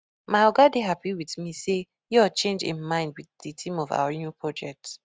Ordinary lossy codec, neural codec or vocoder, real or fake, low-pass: none; none; real; none